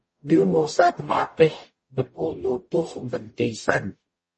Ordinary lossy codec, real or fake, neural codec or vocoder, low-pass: MP3, 32 kbps; fake; codec, 44.1 kHz, 0.9 kbps, DAC; 10.8 kHz